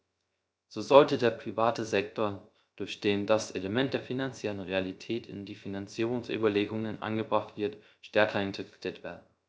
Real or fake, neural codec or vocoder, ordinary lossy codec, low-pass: fake; codec, 16 kHz, 0.3 kbps, FocalCodec; none; none